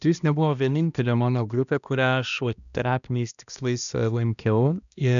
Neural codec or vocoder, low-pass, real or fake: codec, 16 kHz, 1 kbps, X-Codec, HuBERT features, trained on balanced general audio; 7.2 kHz; fake